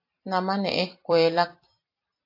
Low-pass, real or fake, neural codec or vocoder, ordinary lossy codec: 5.4 kHz; fake; vocoder, 44.1 kHz, 128 mel bands every 256 samples, BigVGAN v2; AAC, 32 kbps